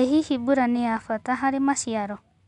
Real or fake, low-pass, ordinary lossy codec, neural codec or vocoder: real; 10.8 kHz; none; none